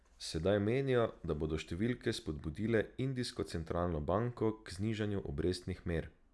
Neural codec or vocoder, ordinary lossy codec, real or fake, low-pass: none; none; real; none